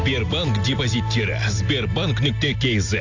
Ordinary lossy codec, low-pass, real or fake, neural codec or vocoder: AAC, 48 kbps; 7.2 kHz; real; none